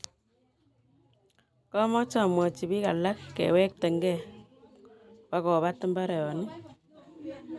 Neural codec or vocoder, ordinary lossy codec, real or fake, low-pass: none; none; real; none